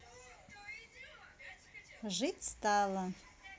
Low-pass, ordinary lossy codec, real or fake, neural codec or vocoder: none; none; real; none